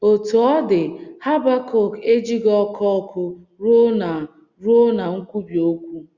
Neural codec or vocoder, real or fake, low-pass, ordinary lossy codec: none; real; 7.2 kHz; Opus, 64 kbps